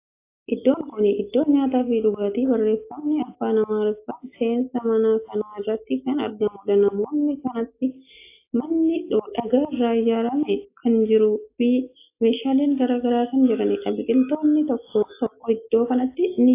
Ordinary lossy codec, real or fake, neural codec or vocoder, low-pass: AAC, 24 kbps; real; none; 3.6 kHz